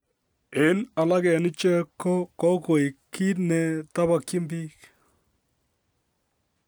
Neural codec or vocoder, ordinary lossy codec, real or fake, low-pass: none; none; real; none